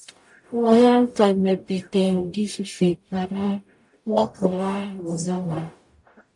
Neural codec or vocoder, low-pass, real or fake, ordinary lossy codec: codec, 44.1 kHz, 0.9 kbps, DAC; 10.8 kHz; fake; MP3, 64 kbps